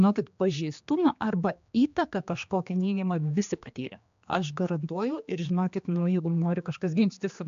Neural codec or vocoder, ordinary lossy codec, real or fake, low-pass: codec, 16 kHz, 2 kbps, X-Codec, HuBERT features, trained on general audio; AAC, 64 kbps; fake; 7.2 kHz